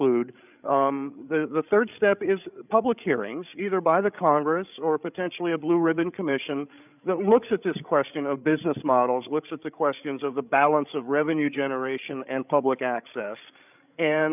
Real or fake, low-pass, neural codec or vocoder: fake; 3.6 kHz; codec, 16 kHz, 16 kbps, FreqCodec, larger model